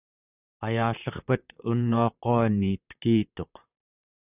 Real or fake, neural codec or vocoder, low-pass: fake; vocoder, 44.1 kHz, 128 mel bands, Pupu-Vocoder; 3.6 kHz